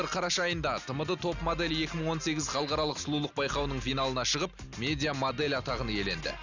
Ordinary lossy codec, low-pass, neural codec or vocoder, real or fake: none; 7.2 kHz; none; real